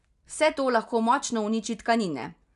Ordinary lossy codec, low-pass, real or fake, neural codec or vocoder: none; 10.8 kHz; real; none